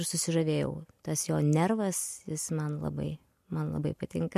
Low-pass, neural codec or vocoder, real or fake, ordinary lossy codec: 14.4 kHz; none; real; MP3, 64 kbps